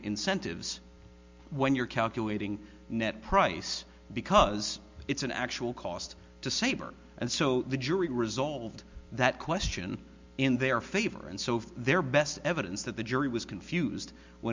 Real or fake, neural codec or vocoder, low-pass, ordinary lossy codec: real; none; 7.2 kHz; MP3, 64 kbps